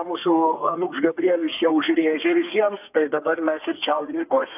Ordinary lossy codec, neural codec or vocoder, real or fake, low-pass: AAC, 32 kbps; codec, 32 kHz, 1.9 kbps, SNAC; fake; 3.6 kHz